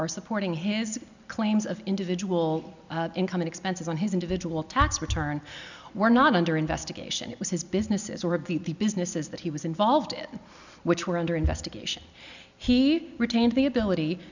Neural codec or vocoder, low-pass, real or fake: none; 7.2 kHz; real